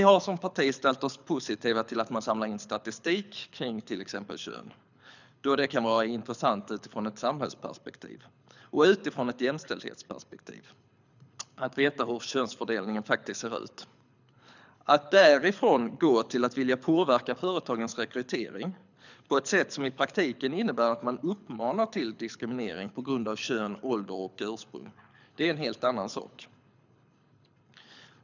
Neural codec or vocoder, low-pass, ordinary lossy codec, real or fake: codec, 24 kHz, 6 kbps, HILCodec; 7.2 kHz; none; fake